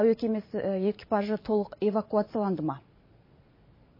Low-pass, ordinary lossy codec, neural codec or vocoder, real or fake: 5.4 kHz; MP3, 24 kbps; vocoder, 44.1 kHz, 128 mel bands every 512 samples, BigVGAN v2; fake